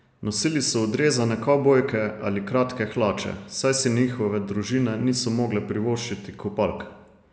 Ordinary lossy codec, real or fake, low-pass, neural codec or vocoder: none; real; none; none